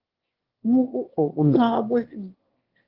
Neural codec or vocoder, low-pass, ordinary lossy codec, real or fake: autoencoder, 22.05 kHz, a latent of 192 numbers a frame, VITS, trained on one speaker; 5.4 kHz; Opus, 16 kbps; fake